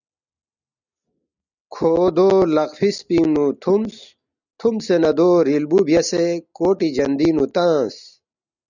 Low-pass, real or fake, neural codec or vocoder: 7.2 kHz; real; none